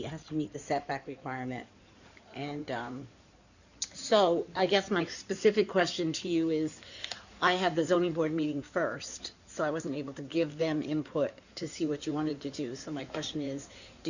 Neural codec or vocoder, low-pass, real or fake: codec, 16 kHz in and 24 kHz out, 2.2 kbps, FireRedTTS-2 codec; 7.2 kHz; fake